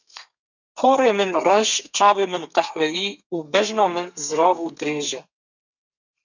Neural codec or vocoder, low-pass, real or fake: codec, 32 kHz, 1.9 kbps, SNAC; 7.2 kHz; fake